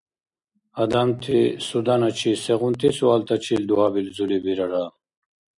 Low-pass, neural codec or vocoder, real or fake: 10.8 kHz; none; real